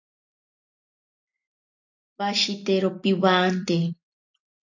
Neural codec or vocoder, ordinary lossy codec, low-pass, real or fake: none; AAC, 48 kbps; 7.2 kHz; real